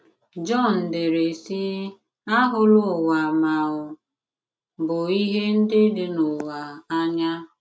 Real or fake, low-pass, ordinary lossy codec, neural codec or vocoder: real; none; none; none